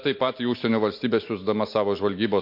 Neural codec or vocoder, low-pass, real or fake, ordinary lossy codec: none; 5.4 kHz; real; MP3, 32 kbps